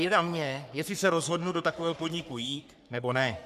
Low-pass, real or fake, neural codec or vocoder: 14.4 kHz; fake; codec, 44.1 kHz, 3.4 kbps, Pupu-Codec